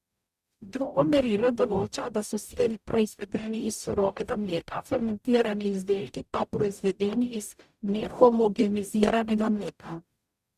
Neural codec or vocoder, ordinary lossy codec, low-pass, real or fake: codec, 44.1 kHz, 0.9 kbps, DAC; Opus, 64 kbps; 14.4 kHz; fake